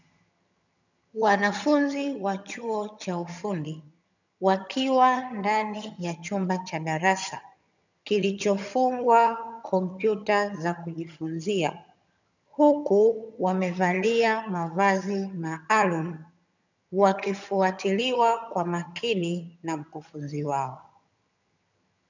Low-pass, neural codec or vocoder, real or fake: 7.2 kHz; vocoder, 22.05 kHz, 80 mel bands, HiFi-GAN; fake